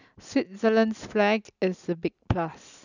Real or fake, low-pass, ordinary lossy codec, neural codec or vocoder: real; 7.2 kHz; none; none